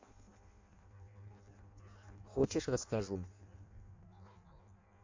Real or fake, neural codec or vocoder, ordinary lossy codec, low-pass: fake; codec, 16 kHz in and 24 kHz out, 0.6 kbps, FireRedTTS-2 codec; MP3, 48 kbps; 7.2 kHz